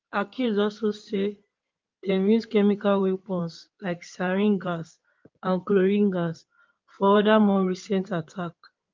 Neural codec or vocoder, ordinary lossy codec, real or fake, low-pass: codec, 16 kHz in and 24 kHz out, 2.2 kbps, FireRedTTS-2 codec; Opus, 32 kbps; fake; 7.2 kHz